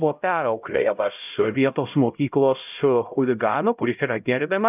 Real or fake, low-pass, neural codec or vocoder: fake; 3.6 kHz; codec, 16 kHz, 0.5 kbps, X-Codec, HuBERT features, trained on LibriSpeech